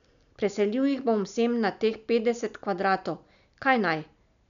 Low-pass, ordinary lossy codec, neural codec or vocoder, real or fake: 7.2 kHz; none; none; real